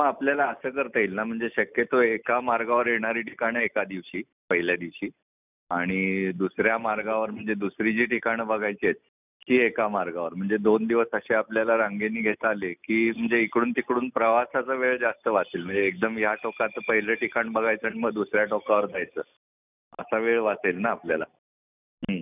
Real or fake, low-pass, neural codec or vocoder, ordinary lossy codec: real; 3.6 kHz; none; none